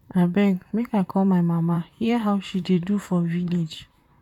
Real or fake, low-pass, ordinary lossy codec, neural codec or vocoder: fake; 19.8 kHz; none; vocoder, 44.1 kHz, 128 mel bands, Pupu-Vocoder